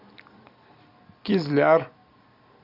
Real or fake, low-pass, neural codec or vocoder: real; 5.4 kHz; none